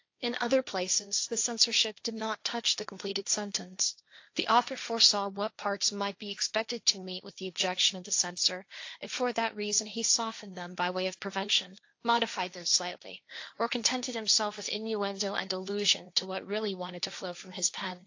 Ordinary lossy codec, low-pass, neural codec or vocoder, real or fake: AAC, 48 kbps; 7.2 kHz; codec, 16 kHz, 1.1 kbps, Voila-Tokenizer; fake